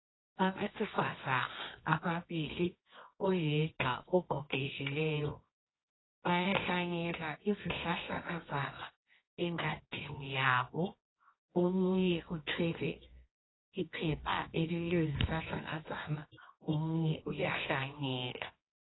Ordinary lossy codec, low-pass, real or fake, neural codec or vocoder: AAC, 16 kbps; 7.2 kHz; fake; codec, 24 kHz, 0.9 kbps, WavTokenizer, medium music audio release